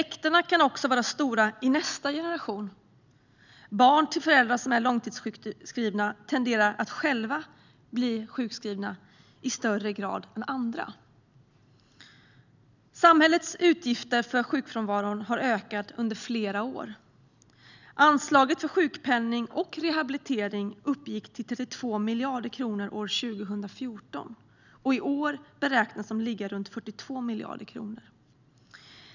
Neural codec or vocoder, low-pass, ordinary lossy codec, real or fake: none; 7.2 kHz; none; real